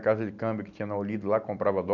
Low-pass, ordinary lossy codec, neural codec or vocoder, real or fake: 7.2 kHz; none; none; real